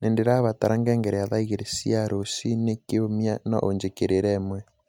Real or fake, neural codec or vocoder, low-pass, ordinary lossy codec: real; none; 19.8 kHz; MP3, 96 kbps